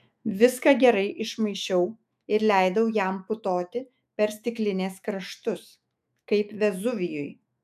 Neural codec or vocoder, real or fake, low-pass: autoencoder, 48 kHz, 128 numbers a frame, DAC-VAE, trained on Japanese speech; fake; 14.4 kHz